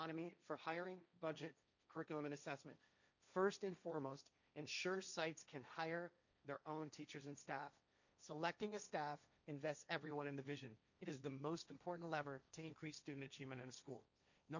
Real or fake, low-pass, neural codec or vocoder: fake; 7.2 kHz; codec, 16 kHz, 1.1 kbps, Voila-Tokenizer